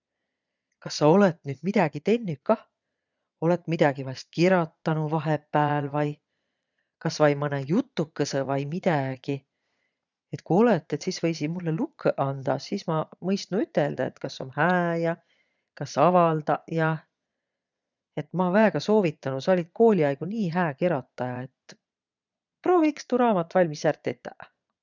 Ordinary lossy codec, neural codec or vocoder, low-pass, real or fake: none; vocoder, 22.05 kHz, 80 mel bands, Vocos; 7.2 kHz; fake